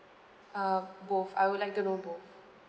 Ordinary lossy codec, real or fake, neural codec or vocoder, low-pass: none; real; none; none